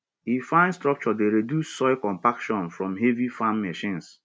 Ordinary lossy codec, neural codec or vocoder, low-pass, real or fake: none; none; none; real